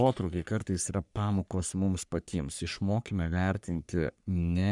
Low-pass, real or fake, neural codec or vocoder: 10.8 kHz; fake; codec, 44.1 kHz, 3.4 kbps, Pupu-Codec